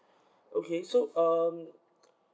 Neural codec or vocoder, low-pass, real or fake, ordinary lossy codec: none; none; real; none